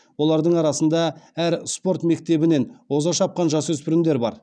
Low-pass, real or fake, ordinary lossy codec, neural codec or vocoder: 9.9 kHz; real; none; none